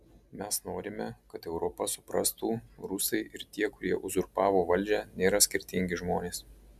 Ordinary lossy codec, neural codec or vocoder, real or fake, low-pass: MP3, 96 kbps; none; real; 14.4 kHz